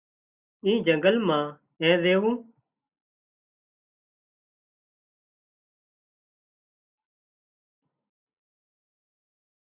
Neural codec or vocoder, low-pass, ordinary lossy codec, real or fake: none; 3.6 kHz; Opus, 64 kbps; real